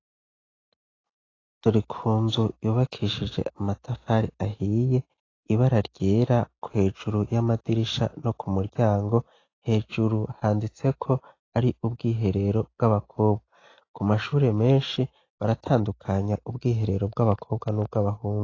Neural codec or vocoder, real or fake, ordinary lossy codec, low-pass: none; real; AAC, 32 kbps; 7.2 kHz